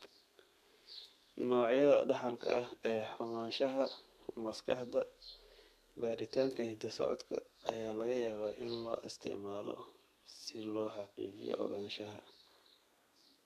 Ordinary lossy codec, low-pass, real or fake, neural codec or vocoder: none; 14.4 kHz; fake; codec, 32 kHz, 1.9 kbps, SNAC